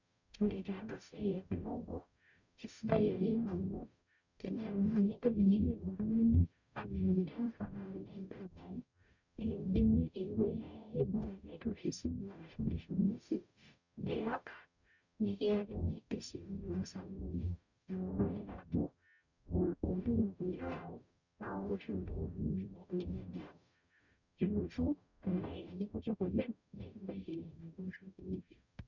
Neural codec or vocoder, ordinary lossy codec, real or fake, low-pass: codec, 44.1 kHz, 0.9 kbps, DAC; none; fake; 7.2 kHz